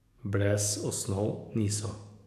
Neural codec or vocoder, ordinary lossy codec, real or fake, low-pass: codec, 44.1 kHz, 7.8 kbps, DAC; none; fake; 14.4 kHz